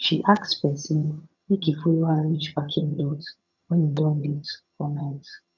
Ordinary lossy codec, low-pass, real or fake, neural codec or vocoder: none; 7.2 kHz; fake; vocoder, 22.05 kHz, 80 mel bands, HiFi-GAN